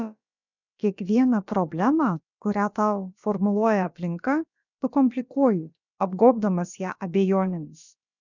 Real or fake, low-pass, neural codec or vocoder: fake; 7.2 kHz; codec, 16 kHz, about 1 kbps, DyCAST, with the encoder's durations